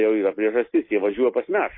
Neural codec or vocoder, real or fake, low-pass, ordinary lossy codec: none; real; 5.4 kHz; MP3, 24 kbps